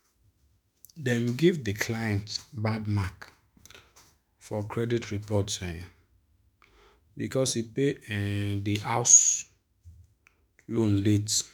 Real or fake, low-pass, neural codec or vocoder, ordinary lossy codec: fake; none; autoencoder, 48 kHz, 32 numbers a frame, DAC-VAE, trained on Japanese speech; none